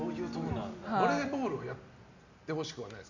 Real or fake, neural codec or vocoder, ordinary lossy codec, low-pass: real; none; none; 7.2 kHz